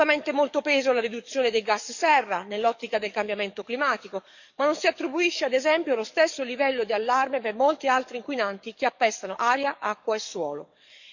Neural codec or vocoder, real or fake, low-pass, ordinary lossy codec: codec, 44.1 kHz, 7.8 kbps, DAC; fake; 7.2 kHz; none